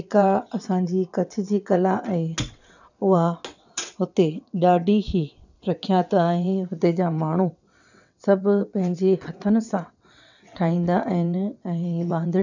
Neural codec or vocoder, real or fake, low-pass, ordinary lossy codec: vocoder, 22.05 kHz, 80 mel bands, WaveNeXt; fake; 7.2 kHz; none